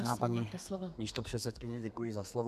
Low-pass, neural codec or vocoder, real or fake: 14.4 kHz; codec, 32 kHz, 1.9 kbps, SNAC; fake